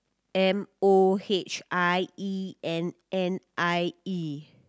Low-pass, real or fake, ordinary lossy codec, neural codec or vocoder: none; real; none; none